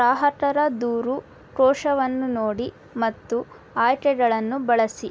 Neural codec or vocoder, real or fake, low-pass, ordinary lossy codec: none; real; none; none